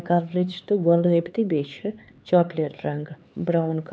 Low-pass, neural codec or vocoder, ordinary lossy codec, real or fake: none; codec, 16 kHz, 4 kbps, X-Codec, HuBERT features, trained on LibriSpeech; none; fake